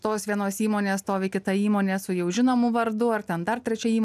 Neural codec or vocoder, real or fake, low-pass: none; real; 14.4 kHz